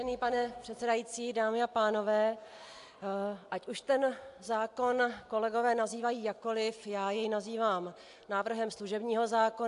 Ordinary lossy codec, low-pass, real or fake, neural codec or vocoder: AAC, 64 kbps; 10.8 kHz; real; none